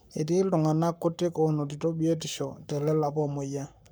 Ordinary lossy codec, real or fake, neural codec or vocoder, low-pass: none; fake; codec, 44.1 kHz, 7.8 kbps, Pupu-Codec; none